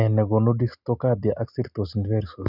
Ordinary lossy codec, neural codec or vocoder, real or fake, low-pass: none; none; real; 5.4 kHz